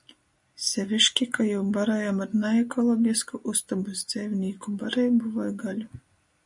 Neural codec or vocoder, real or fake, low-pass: none; real; 10.8 kHz